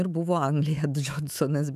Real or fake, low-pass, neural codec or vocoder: fake; 14.4 kHz; autoencoder, 48 kHz, 128 numbers a frame, DAC-VAE, trained on Japanese speech